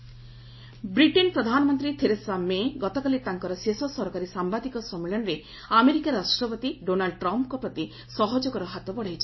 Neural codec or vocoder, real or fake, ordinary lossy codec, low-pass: none; real; MP3, 24 kbps; 7.2 kHz